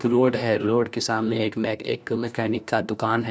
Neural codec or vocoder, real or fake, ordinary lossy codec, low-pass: codec, 16 kHz, 1 kbps, FunCodec, trained on LibriTTS, 50 frames a second; fake; none; none